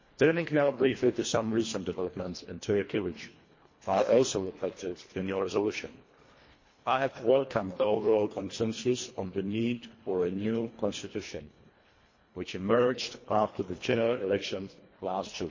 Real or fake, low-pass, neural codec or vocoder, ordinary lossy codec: fake; 7.2 kHz; codec, 24 kHz, 1.5 kbps, HILCodec; MP3, 32 kbps